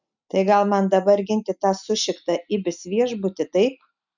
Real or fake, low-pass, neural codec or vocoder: real; 7.2 kHz; none